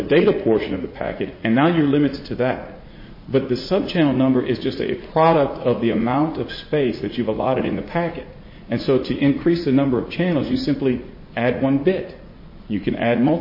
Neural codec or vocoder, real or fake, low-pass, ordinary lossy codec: vocoder, 44.1 kHz, 80 mel bands, Vocos; fake; 5.4 kHz; MP3, 24 kbps